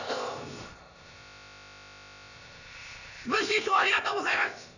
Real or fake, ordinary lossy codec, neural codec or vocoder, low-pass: fake; none; codec, 16 kHz, about 1 kbps, DyCAST, with the encoder's durations; 7.2 kHz